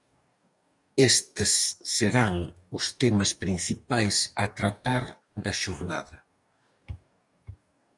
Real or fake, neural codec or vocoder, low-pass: fake; codec, 44.1 kHz, 2.6 kbps, DAC; 10.8 kHz